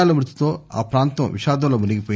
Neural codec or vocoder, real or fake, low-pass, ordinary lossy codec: none; real; none; none